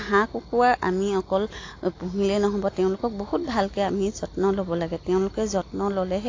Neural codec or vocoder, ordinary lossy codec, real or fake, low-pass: none; AAC, 32 kbps; real; 7.2 kHz